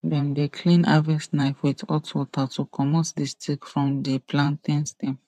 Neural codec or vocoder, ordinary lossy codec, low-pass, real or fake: vocoder, 44.1 kHz, 128 mel bands every 512 samples, BigVGAN v2; none; 14.4 kHz; fake